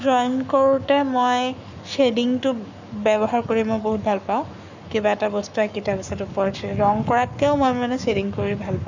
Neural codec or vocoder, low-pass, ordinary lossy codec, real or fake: codec, 44.1 kHz, 7.8 kbps, Pupu-Codec; 7.2 kHz; none; fake